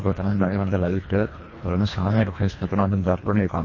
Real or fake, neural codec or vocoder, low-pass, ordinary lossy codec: fake; codec, 24 kHz, 1.5 kbps, HILCodec; 7.2 kHz; MP3, 32 kbps